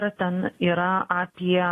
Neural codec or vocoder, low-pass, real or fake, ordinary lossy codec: none; 14.4 kHz; real; AAC, 48 kbps